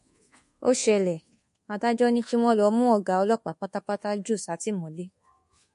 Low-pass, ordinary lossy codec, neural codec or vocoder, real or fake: 10.8 kHz; MP3, 48 kbps; codec, 24 kHz, 1.2 kbps, DualCodec; fake